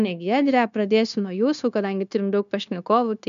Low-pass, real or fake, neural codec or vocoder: 7.2 kHz; fake; codec, 16 kHz, 0.9 kbps, LongCat-Audio-Codec